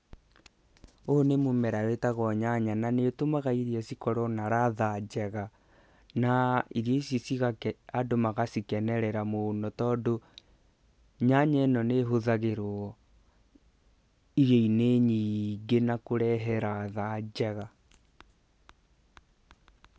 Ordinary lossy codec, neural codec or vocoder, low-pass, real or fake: none; none; none; real